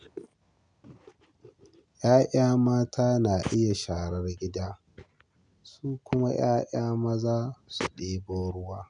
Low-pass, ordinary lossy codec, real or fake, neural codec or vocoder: 9.9 kHz; none; real; none